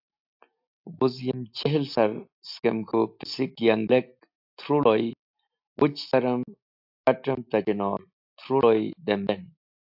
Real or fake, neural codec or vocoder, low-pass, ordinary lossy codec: real; none; 5.4 kHz; AAC, 48 kbps